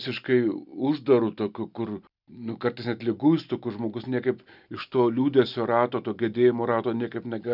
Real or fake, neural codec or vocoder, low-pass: real; none; 5.4 kHz